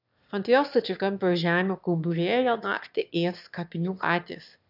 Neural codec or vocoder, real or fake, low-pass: autoencoder, 22.05 kHz, a latent of 192 numbers a frame, VITS, trained on one speaker; fake; 5.4 kHz